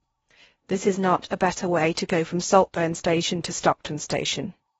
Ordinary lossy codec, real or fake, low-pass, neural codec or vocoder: AAC, 24 kbps; fake; 10.8 kHz; codec, 16 kHz in and 24 kHz out, 0.6 kbps, FocalCodec, streaming, 4096 codes